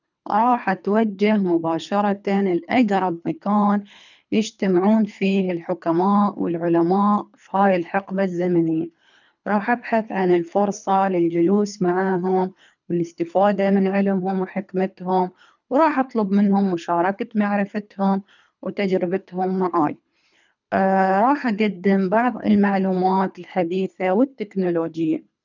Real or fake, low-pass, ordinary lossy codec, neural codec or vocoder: fake; 7.2 kHz; none; codec, 24 kHz, 3 kbps, HILCodec